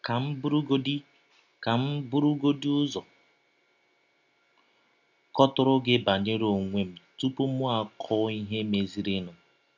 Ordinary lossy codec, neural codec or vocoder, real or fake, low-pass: none; none; real; 7.2 kHz